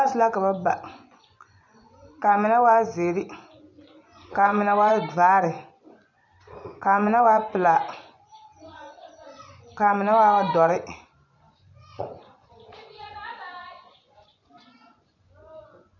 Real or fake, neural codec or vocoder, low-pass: fake; vocoder, 24 kHz, 100 mel bands, Vocos; 7.2 kHz